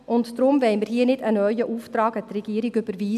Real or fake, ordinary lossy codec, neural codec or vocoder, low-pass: real; none; none; 14.4 kHz